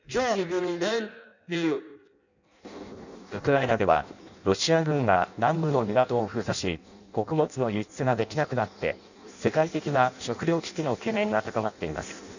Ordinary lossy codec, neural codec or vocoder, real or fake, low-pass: none; codec, 16 kHz in and 24 kHz out, 0.6 kbps, FireRedTTS-2 codec; fake; 7.2 kHz